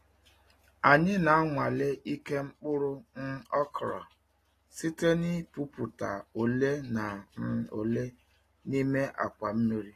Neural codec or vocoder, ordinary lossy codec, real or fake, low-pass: none; AAC, 48 kbps; real; 14.4 kHz